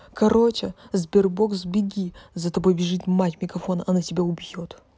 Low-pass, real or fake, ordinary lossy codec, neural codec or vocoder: none; real; none; none